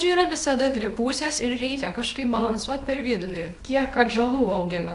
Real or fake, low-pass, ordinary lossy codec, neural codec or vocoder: fake; 10.8 kHz; AAC, 48 kbps; codec, 24 kHz, 0.9 kbps, WavTokenizer, small release